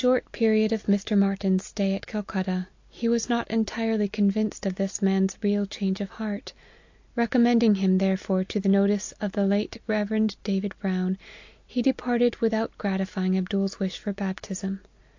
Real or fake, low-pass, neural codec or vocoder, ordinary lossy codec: real; 7.2 kHz; none; AAC, 48 kbps